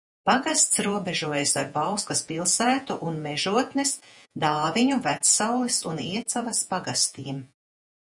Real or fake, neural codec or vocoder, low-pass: fake; vocoder, 48 kHz, 128 mel bands, Vocos; 10.8 kHz